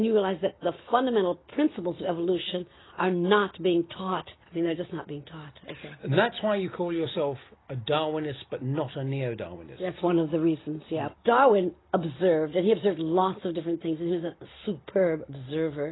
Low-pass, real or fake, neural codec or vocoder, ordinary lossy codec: 7.2 kHz; real; none; AAC, 16 kbps